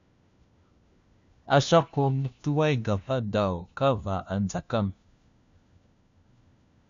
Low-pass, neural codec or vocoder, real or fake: 7.2 kHz; codec, 16 kHz, 1 kbps, FunCodec, trained on LibriTTS, 50 frames a second; fake